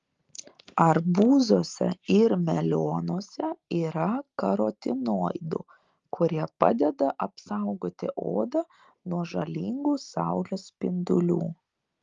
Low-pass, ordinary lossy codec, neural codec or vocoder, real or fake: 7.2 kHz; Opus, 32 kbps; none; real